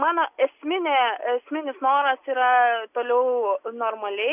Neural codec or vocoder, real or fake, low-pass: none; real; 3.6 kHz